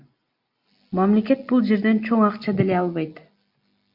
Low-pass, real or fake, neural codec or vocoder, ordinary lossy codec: 5.4 kHz; real; none; Opus, 64 kbps